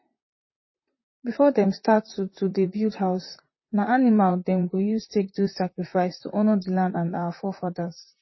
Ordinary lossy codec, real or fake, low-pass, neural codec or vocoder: MP3, 24 kbps; fake; 7.2 kHz; vocoder, 44.1 kHz, 128 mel bands, Pupu-Vocoder